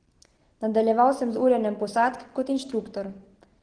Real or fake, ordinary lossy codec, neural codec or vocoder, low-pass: real; Opus, 16 kbps; none; 9.9 kHz